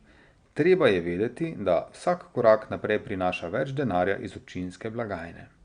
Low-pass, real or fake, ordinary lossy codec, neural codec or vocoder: 9.9 kHz; real; Opus, 64 kbps; none